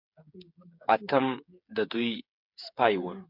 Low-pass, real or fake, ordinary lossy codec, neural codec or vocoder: 5.4 kHz; fake; MP3, 48 kbps; codec, 16 kHz, 8 kbps, FreqCodec, smaller model